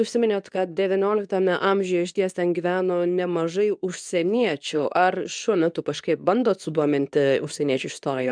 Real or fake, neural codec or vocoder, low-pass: fake; codec, 24 kHz, 0.9 kbps, WavTokenizer, medium speech release version 2; 9.9 kHz